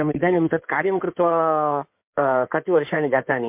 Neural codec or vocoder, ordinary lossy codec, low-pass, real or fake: codec, 16 kHz in and 24 kHz out, 2.2 kbps, FireRedTTS-2 codec; MP3, 32 kbps; 3.6 kHz; fake